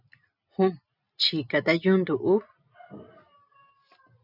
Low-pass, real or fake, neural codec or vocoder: 5.4 kHz; real; none